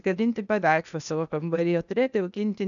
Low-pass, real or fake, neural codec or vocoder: 7.2 kHz; fake; codec, 16 kHz, 0.8 kbps, ZipCodec